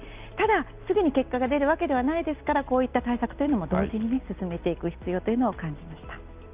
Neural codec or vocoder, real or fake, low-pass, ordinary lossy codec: none; real; 3.6 kHz; Opus, 32 kbps